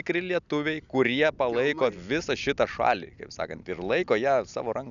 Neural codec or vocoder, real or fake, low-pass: none; real; 7.2 kHz